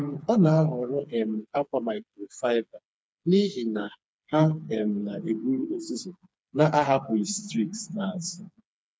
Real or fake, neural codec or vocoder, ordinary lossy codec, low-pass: fake; codec, 16 kHz, 4 kbps, FreqCodec, smaller model; none; none